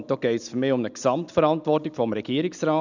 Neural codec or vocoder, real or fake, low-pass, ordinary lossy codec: none; real; 7.2 kHz; none